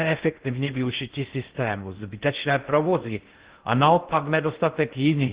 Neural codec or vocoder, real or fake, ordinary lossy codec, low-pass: codec, 16 kHz in and 24 kHz out, 0.6 kbps, FocalCodec, streaming, 2048 codes; fake; Opus, 16 kbps; 3.6 kHz